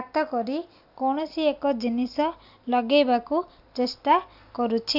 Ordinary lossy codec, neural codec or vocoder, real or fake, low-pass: none; none; real; 5.4 kHz